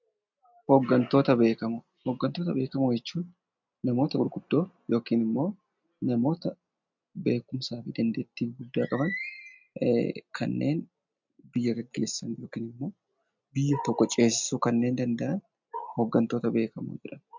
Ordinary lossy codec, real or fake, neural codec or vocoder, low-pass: MP3, 64 kbps; real; none; 7.2 kHz